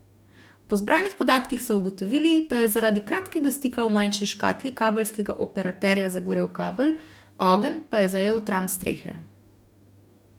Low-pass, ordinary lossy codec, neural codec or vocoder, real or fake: 19.8 kHz; none; codec, 44.1 kHz, 2.6 kbps, DAC; fake